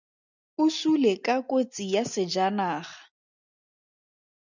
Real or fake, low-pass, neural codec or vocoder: real; 7.2 kHz; none